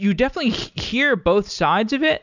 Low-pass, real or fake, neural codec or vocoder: 7.2 kHz; real; none